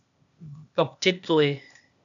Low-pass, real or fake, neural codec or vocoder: 7.2 kHz; fake; codec, 16 kHz, 0.8 kbps, ZipCodec